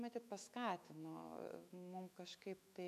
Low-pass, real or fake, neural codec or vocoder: 14.4 kHz; fake; autoencoder, 48 kHz, 128 numbers a frame, DAC-VAE, trained on Japanese speech